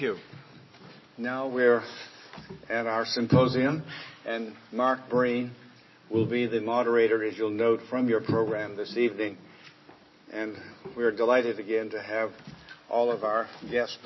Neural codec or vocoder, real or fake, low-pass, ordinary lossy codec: none; real; 7.2 kHz; MP3, 24 kbps